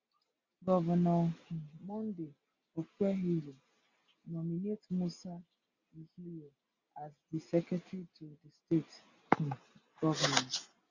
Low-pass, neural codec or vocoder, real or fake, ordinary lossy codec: 7.2 kHz; none; real; Opus, 64 kbps